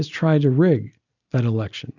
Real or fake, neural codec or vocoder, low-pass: real; none; 7.2 kHz